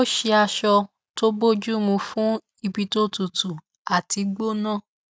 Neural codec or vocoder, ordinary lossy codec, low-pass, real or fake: none; none; none; real